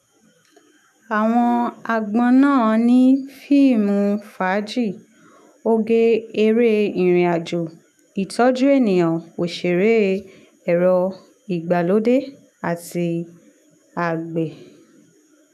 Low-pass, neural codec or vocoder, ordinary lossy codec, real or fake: 14.4 kHz; autoencoder, 48 kHz, 128 numbers a frame, DAC-VAE, trained on Japanese speech; none; fake